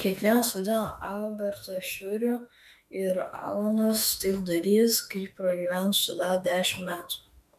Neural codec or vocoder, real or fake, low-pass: autoencoder, 48 kHz, 32 numbers a frame, DAC-VAE, trained on Japanese speech; fake; 14.4 kHz